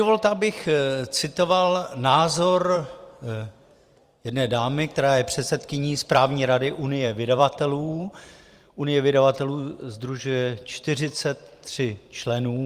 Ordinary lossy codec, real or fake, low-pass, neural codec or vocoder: Opus, 32 kbps; fake; 14.4 kHz; vocoder, 44.1 kHz, 128 mel bands every 512 samples, BigVGAN v2